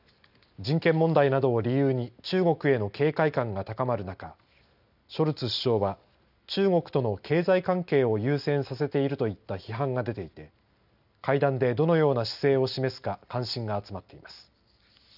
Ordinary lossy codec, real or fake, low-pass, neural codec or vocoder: none; real; 5.4 kHz; none